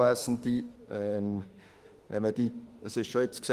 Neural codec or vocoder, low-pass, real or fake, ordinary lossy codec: autoencoder, 48 kHz, 32 numbers a frame, DAC-VAE, trained on Japanese speech; 14.4 kHz; fake; Opus, 24 kbps